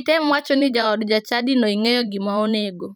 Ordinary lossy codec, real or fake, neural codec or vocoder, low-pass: none; fake; vocoder, 44.1 kHz, 128 mel bands every 512 samples, BigVGAN v2; none